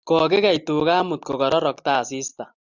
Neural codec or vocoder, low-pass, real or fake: none; 7.2 kHz; real